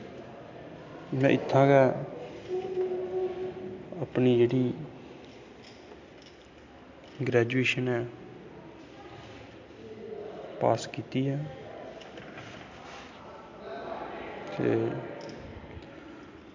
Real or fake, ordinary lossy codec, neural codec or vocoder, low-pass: real; MP3, 64 kbps; none; 7.2 kHz